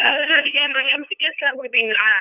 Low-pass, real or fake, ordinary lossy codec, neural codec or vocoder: 3.6 kHz; fake; none; codec, 16 kHz, 2 kbps, FunCodec, trained on LibriTTS, 25 frames a second